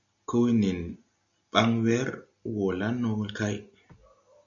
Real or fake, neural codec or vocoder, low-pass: real; none; 7.2 kHz